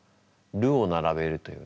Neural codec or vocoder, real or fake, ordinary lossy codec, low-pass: none; real; none; none